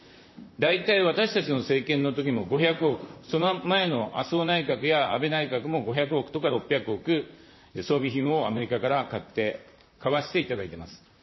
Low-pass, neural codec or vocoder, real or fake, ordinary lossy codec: 7.2 kHz; vocoder, 44.1 kHz, 128 mel bands, Pupu-Vocoder; fake; MP3, 24 kbps